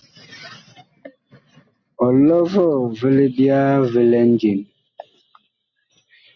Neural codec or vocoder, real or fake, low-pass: none; real; 7.2 kHz